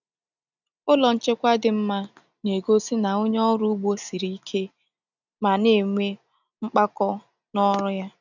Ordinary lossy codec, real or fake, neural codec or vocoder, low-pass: none; real; none; 7.2 kHz